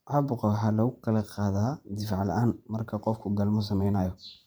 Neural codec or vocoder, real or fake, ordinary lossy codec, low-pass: vocoder, 44.1 kHz, 128 mel bands every 512 samples, BigVGAN v2; fake; none; none